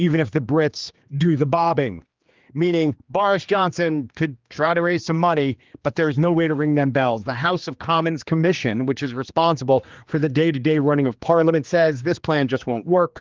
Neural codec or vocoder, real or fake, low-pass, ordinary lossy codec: codec, 16 kHz, 2 kbps, X-Codec, HuBERT features, trained on general audio; fake; 7.2 kHz; Opus, 32 kbps